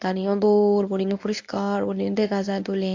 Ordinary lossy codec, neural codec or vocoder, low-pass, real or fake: AAC, 48 kbps; codec, 24 kHz, 0.9 kbps, WavTokenizer, medium speech release version 1; 7.2 kHz; fake